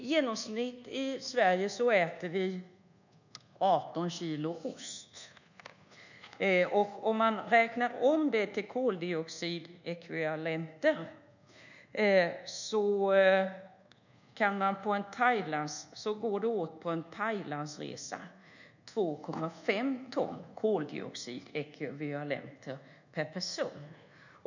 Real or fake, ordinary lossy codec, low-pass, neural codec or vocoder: fake; none; 7.2 kHz; codec, 24 kHz, 1.2 kbps, DualCodec